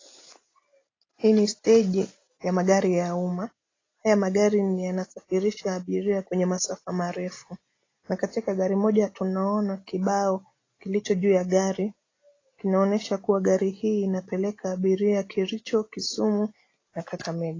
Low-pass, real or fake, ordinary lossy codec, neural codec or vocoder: 7.2 kHz; real; AAC, 32 kbps; none